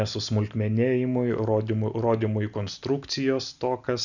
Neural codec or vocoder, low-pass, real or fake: none; 7.2 kHz; real